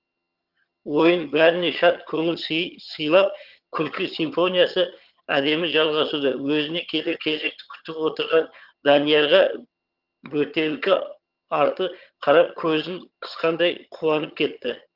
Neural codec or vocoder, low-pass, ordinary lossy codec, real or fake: vocoder, 22.05 kHz, 80 mel bands, HiFi-GAN; 5.4 kHz; Opus, 32 kbps; fake